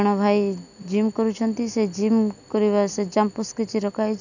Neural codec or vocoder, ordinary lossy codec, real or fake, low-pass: none; none; real; 7.2 kHz